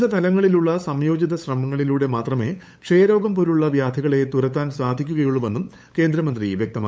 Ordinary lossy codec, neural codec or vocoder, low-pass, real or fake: none; codec, 16 kHz, 8 kbps, FunCodec, trained on LibriTTS, 25 frames a second; none; fake